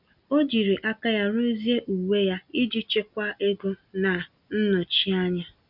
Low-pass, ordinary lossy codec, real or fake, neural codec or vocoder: 5.4 kHz; none; real; none